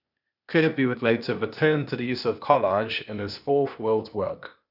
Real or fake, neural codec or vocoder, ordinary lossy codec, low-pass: fake; codec, 16 kHz, 0.8 kbps, ZipCodec; none; 5.4 kHz